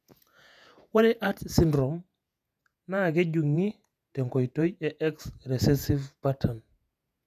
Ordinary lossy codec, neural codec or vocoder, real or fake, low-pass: none; vocoder, 48 kHz, 128 mel bands, Vocos; fake; 14.4 kHz